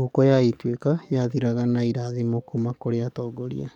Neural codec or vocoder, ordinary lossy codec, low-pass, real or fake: codec, 44.1 kHz, 7.8 kbps, DAC; MP3, 96 kbps; 19.8 kHz; fake